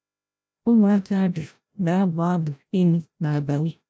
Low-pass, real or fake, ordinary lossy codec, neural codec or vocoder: none; fake; none; codec, 16 kHz, 0.5 kbps, FreqCodec, larger model